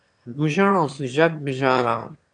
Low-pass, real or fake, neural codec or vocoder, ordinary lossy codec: 9.9 kHz; fake; autoencoder, 22.05 kHz, a latent of 192 numbers a frame, VITS, trained on one speaker; AAC, 64 kbps